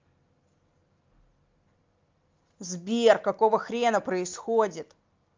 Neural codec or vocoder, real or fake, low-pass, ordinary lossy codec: none; real; 7.2 kHz; Opus, 24 kbps